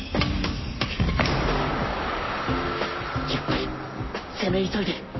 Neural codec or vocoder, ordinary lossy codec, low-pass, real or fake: codec, 44.1 kHz, 7.8 kbps, Pupu-Codec; MP3, 24 kbps; 7.2 kHz; fake